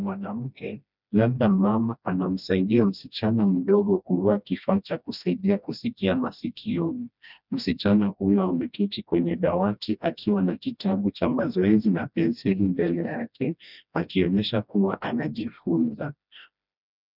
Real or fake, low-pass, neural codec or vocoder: fake; 5.4 kHz; codec, 16 kHz, 1 kbps, FreqCodec, smaller model